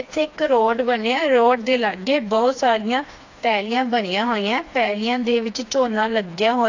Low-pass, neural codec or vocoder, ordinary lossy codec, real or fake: 7.2 kHz; codec, 16 kHz, 2 kbps, FreqCodec, smaller model; AAC, 48 kbps; fake